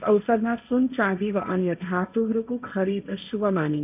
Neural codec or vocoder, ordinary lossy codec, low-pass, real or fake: codec, 16 kHz, 1.1 kbps, Voila-Tokenizer; none; 3.6 kHz; fake